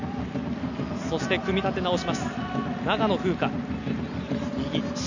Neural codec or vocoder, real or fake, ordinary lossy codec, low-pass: none; real; none; 7.2 kHz